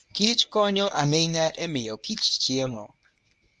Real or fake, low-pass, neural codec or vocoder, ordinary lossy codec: fake; none; codec, 24 kHz, 0.9 kbps, WavTokenizer, medium speech release version 1; none